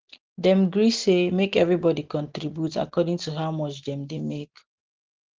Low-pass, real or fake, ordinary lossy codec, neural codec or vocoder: 7.2 kHz; real; Opus, 16 kbps; none